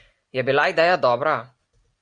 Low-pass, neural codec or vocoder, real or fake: 9.9 kHz; none; real